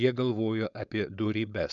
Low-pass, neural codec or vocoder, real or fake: 7.2 kHz; codec, 16 kHz, 4.8 kbps, FACodec; fake